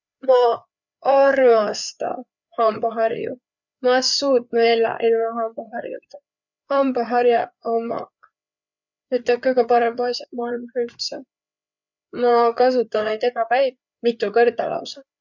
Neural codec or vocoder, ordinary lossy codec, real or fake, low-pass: codec, 16 kHz, 4 kbps, FreqCodec, larger model; none; fake; 7.2 kHz